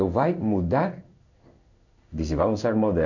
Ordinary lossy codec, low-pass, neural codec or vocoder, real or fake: none; 7.2 kHz; codec, 16 kHz in and 24 kHz out, 1 kbps, XY-Tokenizer; fake